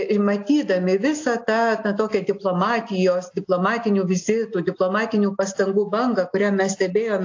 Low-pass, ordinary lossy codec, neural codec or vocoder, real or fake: 7.2 kHz; AAC, 48 kbps; none; real